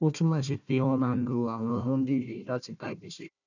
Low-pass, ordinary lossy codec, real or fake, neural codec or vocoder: 7.2 kHz; none; fake; codec, 16 kHz, 1 kbps, FunCodec, trained on Chinese and English, 50 frames a second